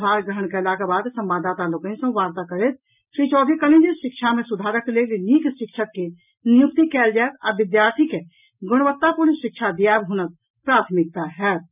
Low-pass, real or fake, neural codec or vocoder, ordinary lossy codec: 3.6 kHz; real; none; none